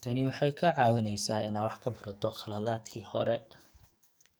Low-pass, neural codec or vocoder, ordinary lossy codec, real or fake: none; codec, 44.1 kHz, 2.6 kbps, SNAC; none; fake